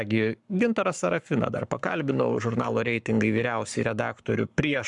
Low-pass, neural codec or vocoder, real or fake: 10.8 kHz; codec, 44.1 kHz, 7.8 kbps, Pupu-Codec; fake